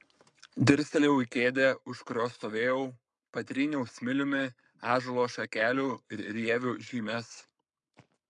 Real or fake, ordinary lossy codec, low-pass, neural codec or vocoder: fake; MP3, 96 kbps; 10.8 kHz; codec, 44.1 kHz, 7.8 kbps, Pupu-Codec